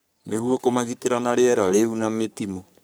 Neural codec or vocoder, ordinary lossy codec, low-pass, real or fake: codec, 44.1 kHz, 3.4 kbps, Pupu-Codec; none; none; fake